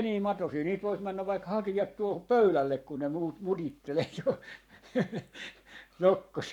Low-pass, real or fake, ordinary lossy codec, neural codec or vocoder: 19.8 kHz; fake; none; codec, 44.1 kHz, 7.8 kbps, Pupu-Codec